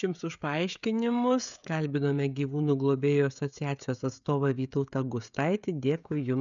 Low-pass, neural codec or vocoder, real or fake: 7.2 kHz; codec, 16 kHz, 16 kbps, FreqCodec, smaller model; fake